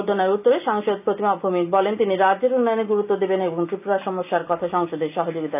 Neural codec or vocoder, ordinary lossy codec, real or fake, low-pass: none; none; real; 3.6 kHz